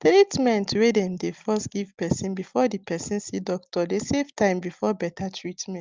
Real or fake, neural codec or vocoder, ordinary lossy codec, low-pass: real; none; Opus, 24 kbps; 7.2 kHz